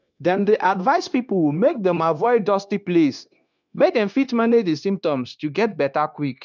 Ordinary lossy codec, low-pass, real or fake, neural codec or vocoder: none; 7.2 kHz; fake; codec, 16 kHz, 0.9 kbps, LongCat-Audio-Codec